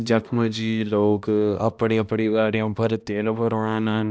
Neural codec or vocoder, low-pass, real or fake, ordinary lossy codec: codec, 16 kHz, 1 kbps, X-Codec, HuBERT features, trained on balanced general audio; none; fake; none